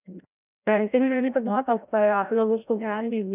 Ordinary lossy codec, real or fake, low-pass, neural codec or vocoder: none; fake; 3.6 kHz; codec, 16 kHz, 0.5 kbps, FreqCodec, larger model